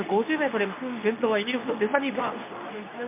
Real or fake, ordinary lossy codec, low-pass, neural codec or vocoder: fake; MP3, 32 kbps; 3.6 kHz; codec, 24 kHz, 0.9 kbps, WavTokenizer, medium speech release version 2